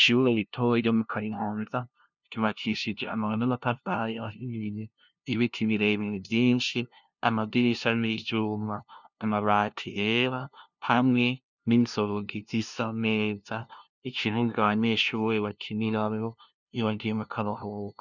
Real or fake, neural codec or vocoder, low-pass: fake; codec, 16 kHz, 0.5 kbps, FunCodec, trained on LibriTTS, 25 frames a second; 7.2 kHz